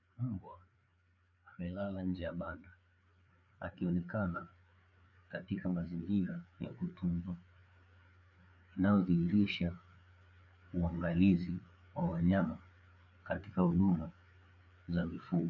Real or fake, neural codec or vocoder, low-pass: fake; codec, 16 kHz, 4 kbps, FreqCodec, larger model; 7.2 kHz